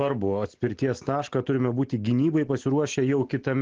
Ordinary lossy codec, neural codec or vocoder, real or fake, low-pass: Opus, 16 kbps; none; real; 7.2 kHz